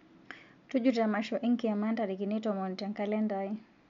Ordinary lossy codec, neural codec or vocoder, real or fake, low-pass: MP3, 64 kbps; none; real; 7.2 kHz